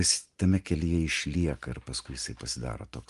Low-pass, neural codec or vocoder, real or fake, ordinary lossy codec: 10.8 kHz; none; real; Opus, 32 kbps